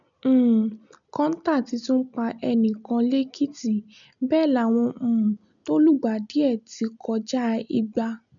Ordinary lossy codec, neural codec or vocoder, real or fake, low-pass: none; none; real; 7.2 kHz